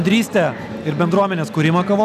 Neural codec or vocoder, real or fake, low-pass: none; real; 14.4 kHz